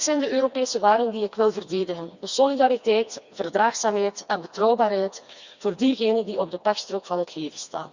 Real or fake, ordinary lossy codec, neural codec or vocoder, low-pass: fake; Opus, 64 kbps; codec, 16 kHz, 2 kbps, FreqCodec, smaller model; 7.2 kHz